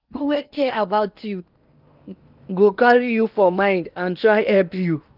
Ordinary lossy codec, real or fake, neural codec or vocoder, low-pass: Opus, 32 kbps; fake; codec, 16 kHz in and 24 kHz out, 0.8 kbps, FocalCodec, streaming, 65536 codes; 5.4 kHz